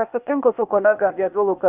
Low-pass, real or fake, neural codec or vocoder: 3.6 kHz; fake; codec, 16 kHz, 0.8 kbps, ZipCodec